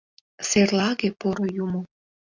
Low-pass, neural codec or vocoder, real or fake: 7.2 kHz; none; real